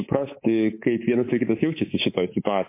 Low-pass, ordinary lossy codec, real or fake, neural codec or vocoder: 3.6 kHz; MP3, 24 kbps; fake; codec, 24 kHz, 3.1 kbps, DualCodec